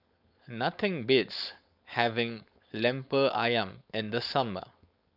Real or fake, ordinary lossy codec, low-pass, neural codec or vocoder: fake; none; 5.4 kHz; codec, 16 kHz, 4.8 kbps, FACodec